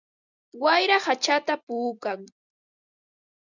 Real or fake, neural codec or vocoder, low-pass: real; none; 7.2 kHz